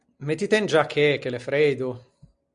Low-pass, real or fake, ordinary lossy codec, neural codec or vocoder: 9.9 kHz; real; Opus, 64 kbps; none